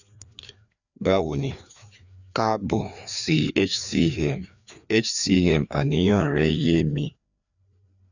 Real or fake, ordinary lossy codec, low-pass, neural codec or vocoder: fake; none; 7.2 kHz; codec, 16 kHz, 2 kbps, FreqCodec, larger model